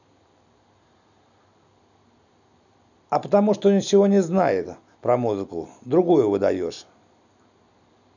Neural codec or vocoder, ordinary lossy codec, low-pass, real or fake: none; none; 7.2 kHz; real